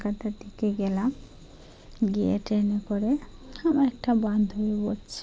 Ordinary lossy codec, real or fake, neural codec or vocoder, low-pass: none; real; none; none